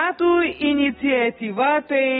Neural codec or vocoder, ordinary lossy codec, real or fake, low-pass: none; AAC, 16 kbps; real; 7.2 kHz